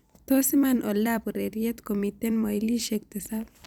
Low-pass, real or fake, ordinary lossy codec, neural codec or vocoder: none; fake; none; vocoder, 44.1 kHz, 128 mel bands every 256 samples, BigVGAN v2